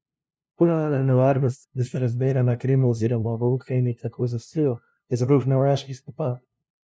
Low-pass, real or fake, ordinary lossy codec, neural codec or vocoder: none; fake; none; codec, 16 kHz, 0.5 kbps, FunCodec, trained on LibriTTS, 25 frames a second